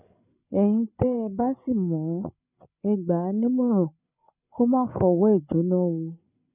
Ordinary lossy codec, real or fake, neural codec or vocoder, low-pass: none; fake; codec, 16 kHz, 8 kbps, FreqCodec, larger model; 3.6 kHz